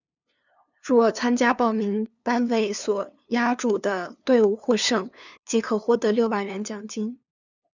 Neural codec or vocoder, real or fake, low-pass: codec, 16 kHz, 2 kbps, FunCodec, trained on LibriTTS, 25 frames a second; fake; 7.2 kHz